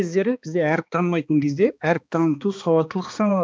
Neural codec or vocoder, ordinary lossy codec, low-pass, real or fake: codec, 16 kHz, 2 kbps, X-Codec, HuBERT features, trained on balanced general audio; none; none; fake